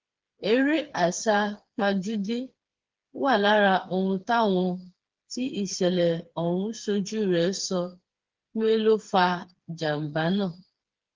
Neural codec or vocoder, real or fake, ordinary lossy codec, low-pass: codec, 16 kHz, 4 kbps, FreqCodec, smaller model; fake; Opus, 16 kbps; 7.2 kHz